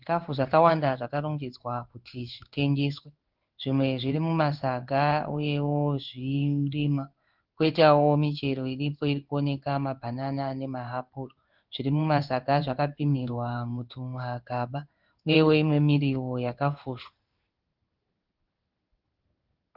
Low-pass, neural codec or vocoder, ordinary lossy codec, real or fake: 5.4 kHz; codec, 16 kHz in and 24 kHz out, 1 kbps, XY-Tokenizer; Opus, 24 kbps; fake